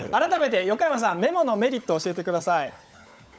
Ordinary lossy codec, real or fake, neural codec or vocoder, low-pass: none; fake; codec, 16 kHz, 16 kbps, FunCodec, trained on LibriTTS, 50 frames a second; none